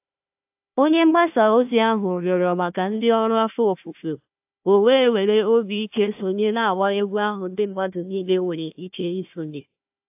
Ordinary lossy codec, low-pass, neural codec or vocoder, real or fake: AAC, 32 kbps; 3.6 kHz; codec, 16 kHz, 1 kbps, FunCodec, trained on Chinese and English, 50 frames a second; fake